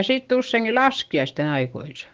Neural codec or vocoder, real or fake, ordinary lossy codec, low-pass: codec, 16 kHz, 6 kbps, DAC; fake; Opus, 16 kbps; 7.2 kHz